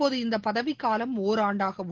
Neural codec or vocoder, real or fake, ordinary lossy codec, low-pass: none; real; Opus, 16 kbps; 7.2 kHz